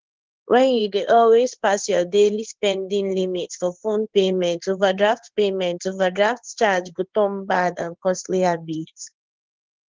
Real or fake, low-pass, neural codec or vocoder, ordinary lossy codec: fake; 7.2 kHz; codec, 16 kHz in and 24 kHz out, 1 kbps, XY-Tokenizer; Opus, 16 kbps